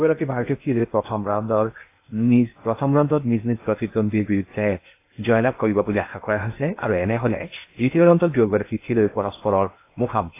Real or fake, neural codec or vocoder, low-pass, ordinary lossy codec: fake; codec, 16 kHz in and 24 kHz out, 0.8 kbps, FocalCodec, streaming, 65536 codes; 3.6 kHz; AAC, 24 kbps